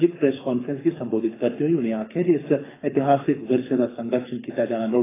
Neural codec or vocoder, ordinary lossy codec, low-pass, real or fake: codec, 24 kHz, 6 kbps, HILCodec; AAC, 16 kbps; 3.6 kHz; fake